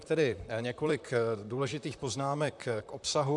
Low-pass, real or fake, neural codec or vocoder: 10.8 kHz; fake; vocoder, 44.1 kHz, 128 mel bands, Pupu-Vocoder